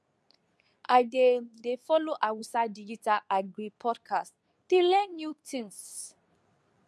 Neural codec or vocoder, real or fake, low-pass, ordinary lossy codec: codec, 24 kHz, 0.9 kbps, WavTokenizer, medium speech release version 2; fake; none; none